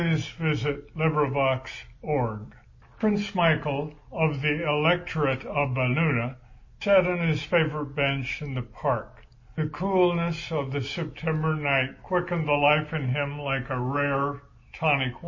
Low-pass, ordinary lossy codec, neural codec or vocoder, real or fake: 7.2 kHz; MP3, 32 kbps; none; real